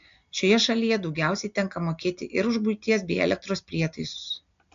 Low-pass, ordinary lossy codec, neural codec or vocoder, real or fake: 7.2 kHz; AAC, 64 kbps; none; real